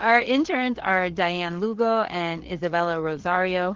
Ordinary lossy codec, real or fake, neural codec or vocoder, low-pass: Opus, 16 kbps; fake; codec, 16 kHz, 4 kbps, FreqCodec, larger model; 7.2 kHz